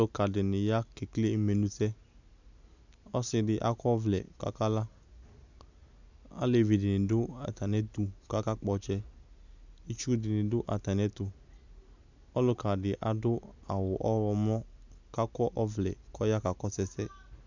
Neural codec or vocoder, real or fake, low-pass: none; real; 7.2 kHz